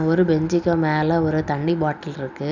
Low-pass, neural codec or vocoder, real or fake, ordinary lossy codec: 7.2 kHz; none; real; none